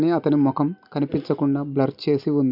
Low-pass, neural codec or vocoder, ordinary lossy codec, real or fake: 5.4 kHz; none; none; real